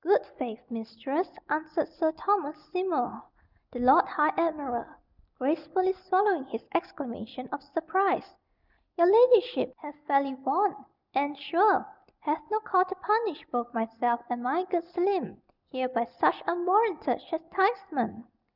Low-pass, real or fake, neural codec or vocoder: 5.4 kHz; real; none